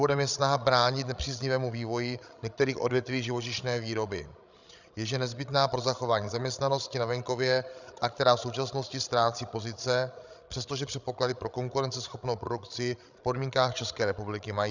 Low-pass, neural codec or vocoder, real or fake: 7.2 kHz; codec, 16 kHz, 16 kbps, FreqCodec, larger model; fake